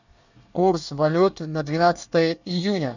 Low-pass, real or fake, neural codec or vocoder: 7.2 kHz; fake; codec, 24 kHz, 1 kbps, SNAC